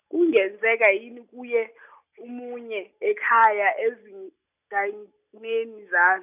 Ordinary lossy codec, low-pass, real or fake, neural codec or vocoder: none; 3.6 kHz; real; none